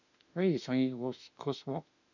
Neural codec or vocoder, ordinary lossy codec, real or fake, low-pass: autoencoder, 48 kHz, 32 numbers a frame, DAC-VAE, trained on Japanese speech; none; fake; 7.2 kHz